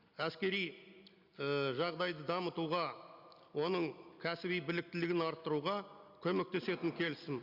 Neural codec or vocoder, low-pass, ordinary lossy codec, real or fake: none; 5.4 kHz; Opus, 64 kbps; real